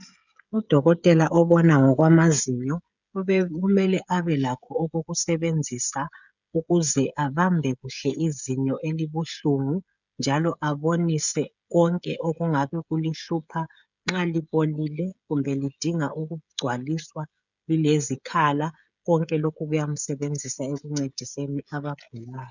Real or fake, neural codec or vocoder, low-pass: fake; codec, 16 kHz, 16 kbps, FreqCodec, smaller model; 7.2 kHz